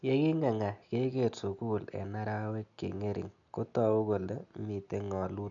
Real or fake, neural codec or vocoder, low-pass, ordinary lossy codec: real; none; 7.2 kHz; none